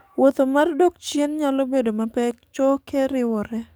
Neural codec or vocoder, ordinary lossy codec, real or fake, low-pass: codec, 44.1 kHz, 7.8 kbps, DAC; none; fake; none